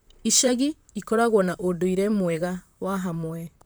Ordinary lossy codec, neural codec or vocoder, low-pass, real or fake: none; vocoder, 44.1 kHz, 128 mel bands, Pupu-Vocoder; none; fake